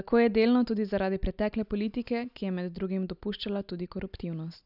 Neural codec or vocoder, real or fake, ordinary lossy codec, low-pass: none; real; none; 5.4 kHz